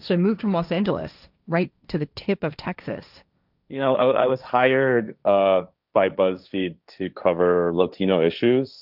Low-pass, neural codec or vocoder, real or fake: 5.4 kHz; codec, 16 kHz, 1.1 kbps, Voila-Tokenizer; fake